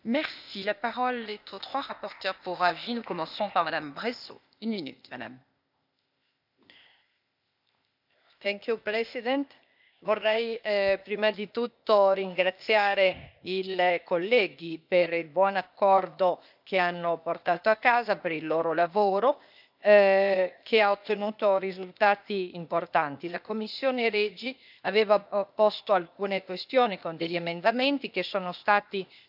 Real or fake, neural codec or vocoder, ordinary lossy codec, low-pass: fake; codec, 16 kHz, 0.8 kbps, ZipCodec; none; 5.4 kHz